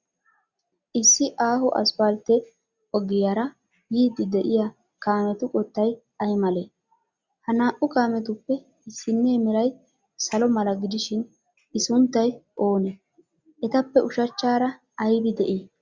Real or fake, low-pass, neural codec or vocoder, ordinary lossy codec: real; 7.2 kHz; none; Opus, 64 kbps